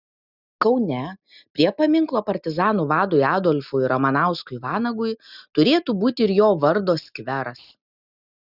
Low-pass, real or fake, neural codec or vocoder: 5.4 kHz; real; none